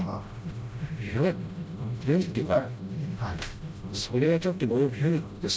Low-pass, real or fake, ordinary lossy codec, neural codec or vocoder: none; fake; none; codec, 16 kHz, 0.5 kbps, FreqCodec, smaller model